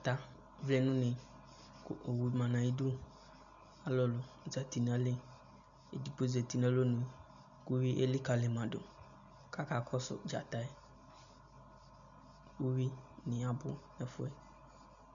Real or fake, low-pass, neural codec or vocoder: real; 7.2 kHz; none